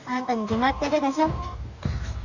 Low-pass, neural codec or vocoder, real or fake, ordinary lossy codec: 7.2 kHz; codec, 44.1 kHz, 2.6 kbps, DAC; fake; none